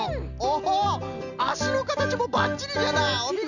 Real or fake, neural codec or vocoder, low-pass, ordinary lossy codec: real; none; 7.2 kHz; none